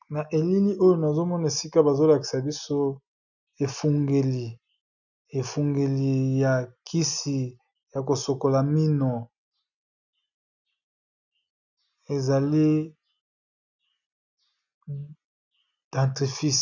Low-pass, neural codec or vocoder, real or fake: 7.2 kHz; none; real